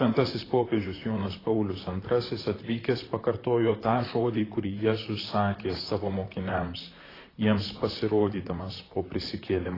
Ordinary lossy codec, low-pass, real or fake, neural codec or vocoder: AAC, 24 kbps; 5.4 kHz; fake; vocoder, 44.1 kHz, 128 mel bands, Pupu-Vocoder